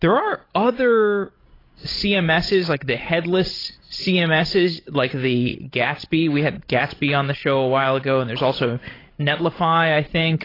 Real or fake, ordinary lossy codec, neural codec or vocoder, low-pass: fake; AAC, 24 kbps; codec, 16 kHz, 16 kbps, FreqCodec, larger model; 5.4 kHz